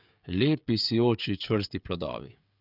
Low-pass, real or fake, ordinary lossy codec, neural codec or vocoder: 5.4 kHz; fake; none; codec, 16 kHz, 16 kbps, FreqCodec, smaller model